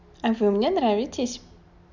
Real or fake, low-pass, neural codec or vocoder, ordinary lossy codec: real; 7.2 kHz; none; none